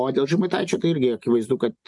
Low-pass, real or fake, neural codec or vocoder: 10.8 kHz; real; none